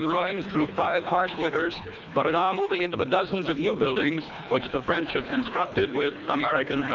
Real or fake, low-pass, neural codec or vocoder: fake; 7.2 kHz; codec, 24 kHz, 1.5 kbps, HILCodec